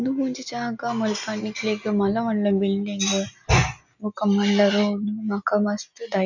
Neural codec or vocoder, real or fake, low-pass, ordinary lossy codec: none; real; 7.2 kHz; none